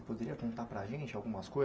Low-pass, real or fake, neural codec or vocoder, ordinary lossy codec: none; real; none; none